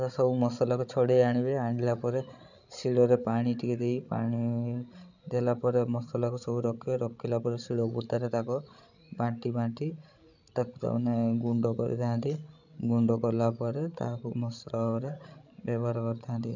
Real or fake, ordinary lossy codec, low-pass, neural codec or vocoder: fake; none; 7.2 kHz; codec, 16 kHz, 16 kbps, FreqCodec, larger model